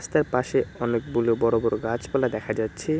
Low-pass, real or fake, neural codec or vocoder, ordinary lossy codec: none; real; none; none